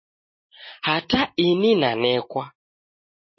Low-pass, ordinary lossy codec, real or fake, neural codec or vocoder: 7.2 kHz; MP3, 24 kbps; real; none